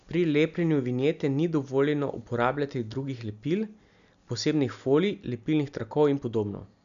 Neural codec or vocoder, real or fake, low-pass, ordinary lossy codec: none; real; 7.2 kHz; none